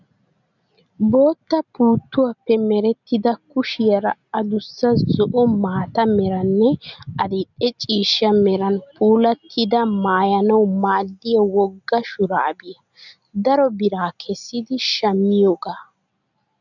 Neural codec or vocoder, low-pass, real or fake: none; 7.2 kHz; real